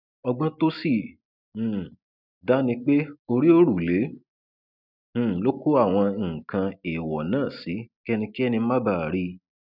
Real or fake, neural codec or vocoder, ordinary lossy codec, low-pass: real; none; none; 5.4 kHz